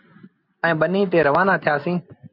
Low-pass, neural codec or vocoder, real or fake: 5.4 kHz; none; real